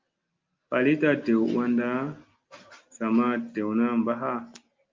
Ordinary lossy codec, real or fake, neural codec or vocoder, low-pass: Opus, 24 kbps; real; none; 7.2 kHz